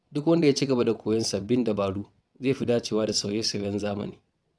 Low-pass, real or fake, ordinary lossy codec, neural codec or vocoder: none; fake; none; vocoder, 22.05 kHz, 80 mel bands, WaveNeXt